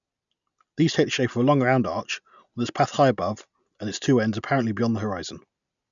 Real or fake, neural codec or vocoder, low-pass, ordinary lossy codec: real; none; 7.2 kHz; none